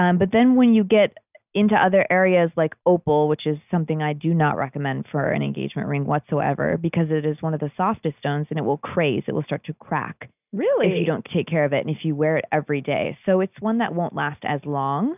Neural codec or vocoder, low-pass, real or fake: none; 3.6 kHz; real